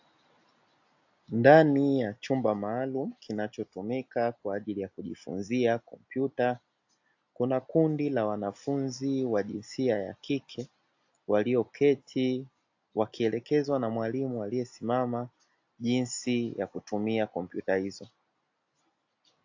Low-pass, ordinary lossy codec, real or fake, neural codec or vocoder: 7.2 kHz; AAC, 48 kbps; real; none